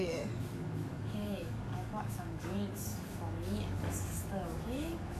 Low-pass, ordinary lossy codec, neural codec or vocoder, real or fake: 14.4 kHz; none; codec, 44.1 kHz, 7.8 kbps, DAC; fake